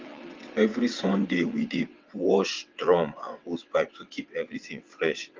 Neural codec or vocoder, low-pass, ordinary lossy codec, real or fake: vocoder, 22.05 kHz, 80 mel bands, WaveNeXt; 7.2 kHz; Opus, 24 kbps; fake